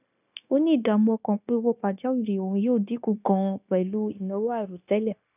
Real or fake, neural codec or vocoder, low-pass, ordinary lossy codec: fake; codec, 16 kHz, 0.9 kbps, LongCat-Audio-Codec; 3.6 kHz; none